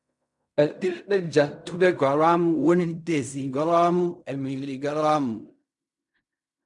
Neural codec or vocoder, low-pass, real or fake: codec, 16 kHz in and 24 kHz out, 0.4 kbps, LongCat-Audio-Codec, fine tuned four codebook decoder; 10.8 kHz; fake